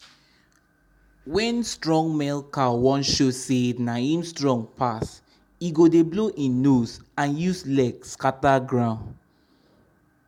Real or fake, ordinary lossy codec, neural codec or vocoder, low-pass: real; MP3, 96 kbps; none; 19.8 kHz